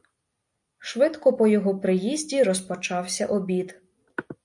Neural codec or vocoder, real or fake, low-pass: none; real; 10.8 kHz